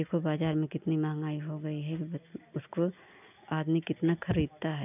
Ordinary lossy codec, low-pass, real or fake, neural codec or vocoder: none; 3.6 kHz; real; none